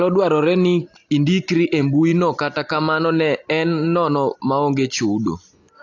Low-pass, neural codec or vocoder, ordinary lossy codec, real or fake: 7.2 kHz; none; AAC, 48 kbps; real